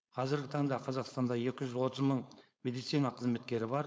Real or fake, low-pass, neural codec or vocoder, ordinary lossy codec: fake; none; codec, 16 kHz, 4.8 kbps, FACodec; none